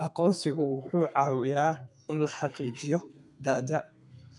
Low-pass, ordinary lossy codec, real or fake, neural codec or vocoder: 10.8 kHz; none; fake; codec, 32 kHz, 1.9 kbps, SNAC